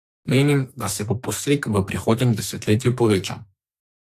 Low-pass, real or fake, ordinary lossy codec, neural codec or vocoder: 14.4 kHz; fake; AAC, 64 kbps; codec, 44.1 kHz, 2.6 kbps, SNAC